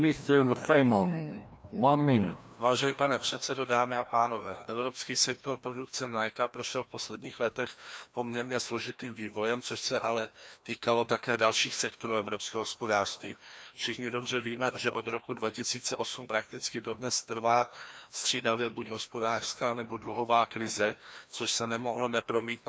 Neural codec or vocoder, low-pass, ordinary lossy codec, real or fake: codec, 16 kHz, 1 kbps, FreqCodec, larger model; none; none; fake